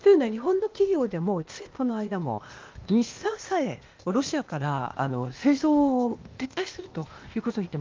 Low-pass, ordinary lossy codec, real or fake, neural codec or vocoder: 7.2 kHz; Opus, 24 kbps; fake; codec, 16 kHz, 0.8 kbps, ZipCodec